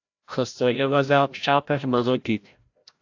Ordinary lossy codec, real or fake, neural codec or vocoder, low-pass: AAC, 48 kbps; fake; codec, 16 kHz, 0.5 kbps, FreqCodec, larger model; 7.2 kHz